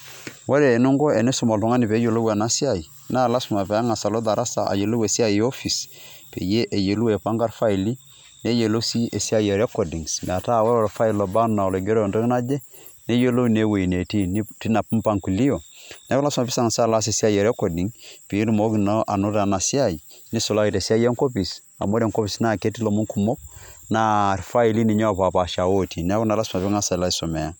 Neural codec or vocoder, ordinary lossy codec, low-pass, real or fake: none; none; none; real